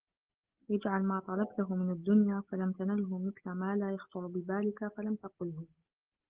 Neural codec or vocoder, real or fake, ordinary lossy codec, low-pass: none; real; Opus, 16 kbps; 3.6 kHz